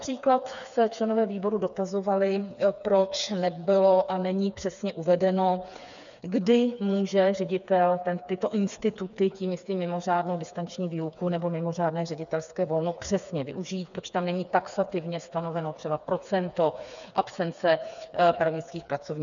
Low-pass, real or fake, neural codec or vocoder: 7.2 kHz; fake; codec, 16 kHz, 4 kbps, FreqCodec, smaller model